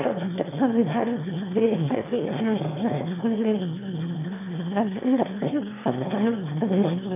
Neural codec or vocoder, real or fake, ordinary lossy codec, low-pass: autoencoder, 22.05 kHz, a latent of 192 numbers a frame, VITS, trained on one speaker; fake; MP3, 32 kbps; 3.6 kHz